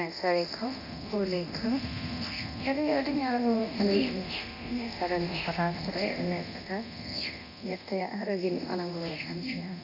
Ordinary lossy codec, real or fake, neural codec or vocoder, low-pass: none; fake; codec, 24 kHz, 0.9 kbps, DualCodec; 5.4 kHz